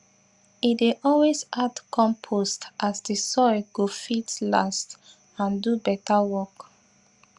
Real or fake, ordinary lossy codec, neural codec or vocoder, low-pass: real; Opus, 64 kbps; none; 10.8 kHz